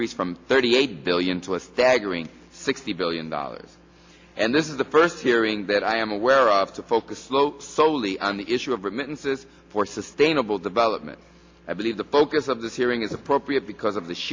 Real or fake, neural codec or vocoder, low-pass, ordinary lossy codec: real; none; 7.2 kHz; MP3, 48 kbps